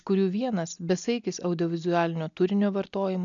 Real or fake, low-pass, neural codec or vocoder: real; 7.2 kHz; none